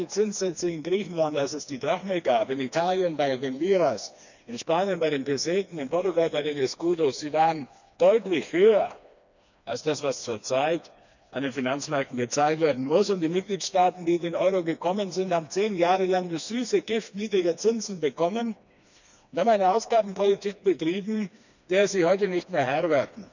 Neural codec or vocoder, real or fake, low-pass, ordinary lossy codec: codec, 16 kHz, 2 kbps, FreqCodec, smaller model; fake; 7.2 kHz; none